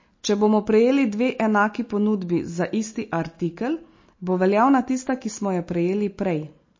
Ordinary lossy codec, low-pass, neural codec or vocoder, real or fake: MP3, 32 kbps; 7.2 kHz; none; real